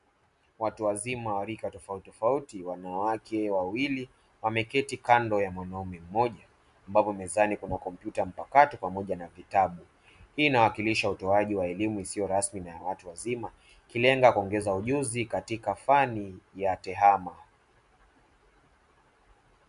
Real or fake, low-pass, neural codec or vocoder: real; 10.8 kHz; none